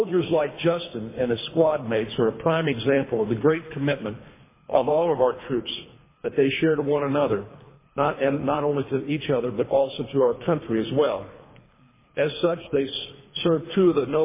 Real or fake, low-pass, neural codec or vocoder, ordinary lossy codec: fake; 3.6 kHz; codec, 24 kHz, 3 kbps, HILCodec; MP3, 16 kbps